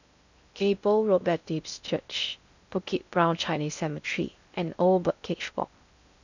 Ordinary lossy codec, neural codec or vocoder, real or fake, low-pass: none; codec, 16 kHz in and 24 kHz out, 0.6 kbps, FocalCodec, streaming, 2048 codes; fake; 7.2 kHz